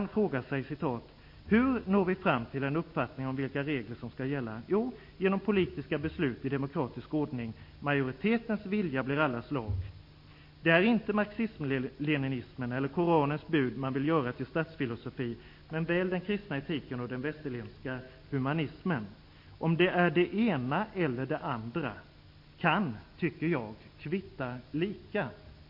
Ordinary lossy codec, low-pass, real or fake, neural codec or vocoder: MP3, 32 kbps; 5.4 kHz; real; none